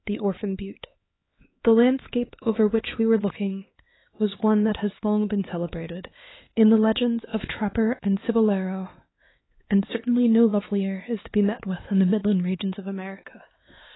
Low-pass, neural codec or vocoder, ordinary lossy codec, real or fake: 7.2 kHz; codec, 16 kHz, 4 kbps, X-Codec, HuBERT features, trained on LibriSpeech; AAC, 16 kbps; fake